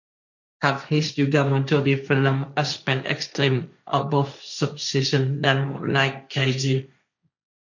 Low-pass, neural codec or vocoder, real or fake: 7.2 kHz; codec, 16 kHz, 1.1 kbps, Voila-Tokenizer; fake